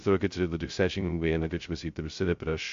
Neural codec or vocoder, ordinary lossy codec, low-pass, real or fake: codec, 16 kHz, 0.2 kbps, FocalCodec; MP3, 48 kbps; 7.2 kHz; fake